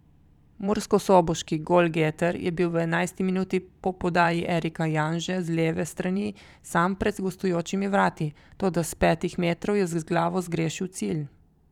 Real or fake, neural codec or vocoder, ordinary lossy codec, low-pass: fake; vocoder, 44.1 kHz, 128 mel bands every 512 samples, BigVGAN v2; none; 19.8 kHz